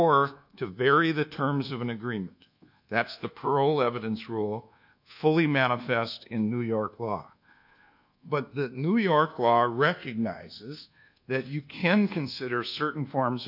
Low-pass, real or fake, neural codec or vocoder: 5.4 kHz; fake; codec, 24 kHz, 1.2 kbps, DualCodec